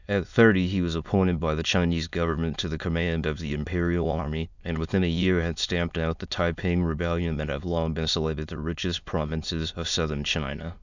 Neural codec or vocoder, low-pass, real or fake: autoencoder, 22.05 kHz, a latent of 192 numbers a frame, VITS, trained on many speakers; 7.2 kHz; fake